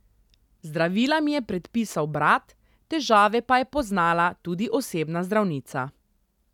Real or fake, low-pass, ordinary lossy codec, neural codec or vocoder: real; 19.8 kHz; none; none